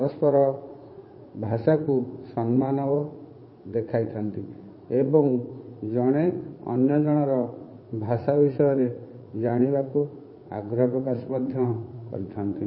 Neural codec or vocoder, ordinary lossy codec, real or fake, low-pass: vocoder, 44.1 kHz, 80 mel bands, Vocos; MP3, 24 kbps; fake; 7.2 kHz